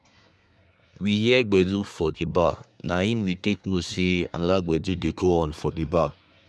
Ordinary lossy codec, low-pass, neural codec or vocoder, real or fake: none; none; codec, 24 kHz, 1 kbps, SNAC; fake